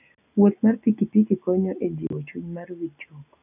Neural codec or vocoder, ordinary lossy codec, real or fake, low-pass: none; none; real; 3.6 kHz